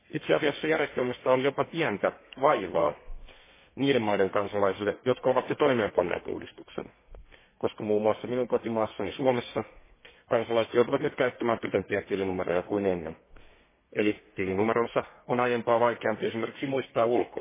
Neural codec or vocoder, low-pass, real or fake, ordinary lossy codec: codec, 32 kHz, 1.9 kbps, SNAC; 3.6 kHz; fake; MP3, 16 kbps